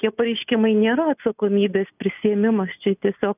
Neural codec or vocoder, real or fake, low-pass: none; real; 3.6 kHz